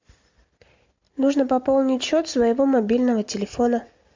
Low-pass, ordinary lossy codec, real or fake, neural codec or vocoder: 7.2 kHz; MP3, 64 kbps; real; none